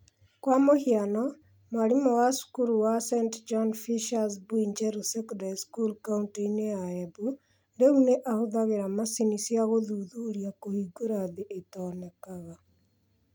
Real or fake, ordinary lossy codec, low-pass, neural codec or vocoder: real; none; none; none